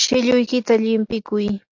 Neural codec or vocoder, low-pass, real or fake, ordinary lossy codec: none; 7.2 kHz; real; AAC, 48 kbps